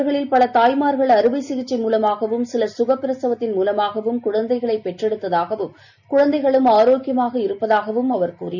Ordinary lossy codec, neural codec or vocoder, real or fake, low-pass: none; none; real; 7.2 kHz